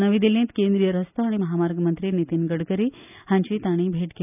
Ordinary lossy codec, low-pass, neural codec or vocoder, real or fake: none; 3.6 kHz; none; real